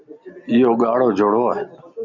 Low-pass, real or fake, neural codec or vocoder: 7.2 kHz; real; none